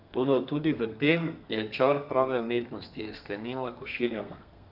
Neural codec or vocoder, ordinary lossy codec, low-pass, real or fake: codec, 32 kHz, 1.9 kbps, SNAC; none; 5.4 kHz; fake